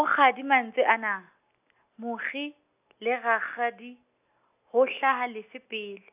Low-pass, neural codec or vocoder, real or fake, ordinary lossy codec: 3.6 kHz; none; real; AAC, 32 kbps